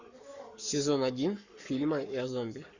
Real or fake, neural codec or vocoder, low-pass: fake; codec, 44.1 kHz, 7.8 kbps, Pupu-Codec; 7.2 kHz